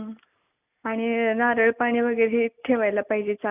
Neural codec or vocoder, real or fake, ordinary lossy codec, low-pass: none; real; none; 3.6 kHz